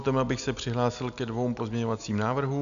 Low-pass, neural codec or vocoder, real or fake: 7.2 kHz; none; real